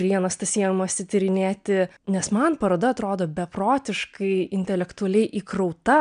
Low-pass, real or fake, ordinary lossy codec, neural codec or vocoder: 9.9 kHz; real; MP3, 96 kbps; none